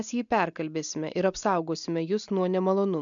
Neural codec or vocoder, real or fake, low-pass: none; real; 7.2 kHz